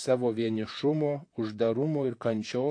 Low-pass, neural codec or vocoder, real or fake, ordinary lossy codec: 9.9 kHz; vocoder, 44.1 kHz, 128 mel bands, Pupu-Vocoder; fake; AAC, 48 kbps